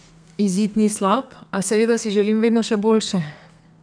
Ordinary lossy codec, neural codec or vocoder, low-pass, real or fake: none; codec, 32 kHz, 1.9 kbps, SNAC; 9.9 kHz; fake